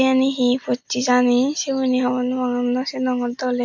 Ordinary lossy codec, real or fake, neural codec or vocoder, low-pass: MP3, 48 kbps; real; none; 7.2 kHz